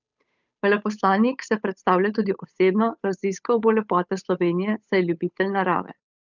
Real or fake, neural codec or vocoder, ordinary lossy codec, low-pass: fake; codec, 16 kHz, 8 kbps, FunCodec, trained on Chinese and English, 25 frames a second; none; 7.2 kHz